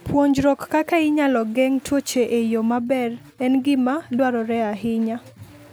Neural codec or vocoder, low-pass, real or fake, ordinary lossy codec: none; none; real; none